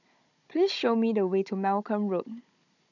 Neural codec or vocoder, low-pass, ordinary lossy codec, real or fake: codec, 16 kHz, 16 kbps, FunCodec, trained on Chinese and English, 50 frames a second; 7.2 kHz; none; fake